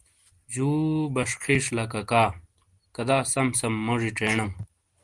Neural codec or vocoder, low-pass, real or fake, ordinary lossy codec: none; 10.8 kHz; real; Opus, 24 kbps